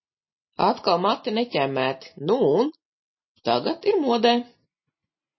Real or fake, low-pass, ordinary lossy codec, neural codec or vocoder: real; 7.2 kHz; MP3, 24 kbps; none